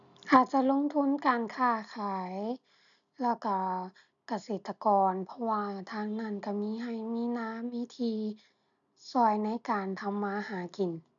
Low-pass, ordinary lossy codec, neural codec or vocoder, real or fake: 7.2 kHz; none; none; real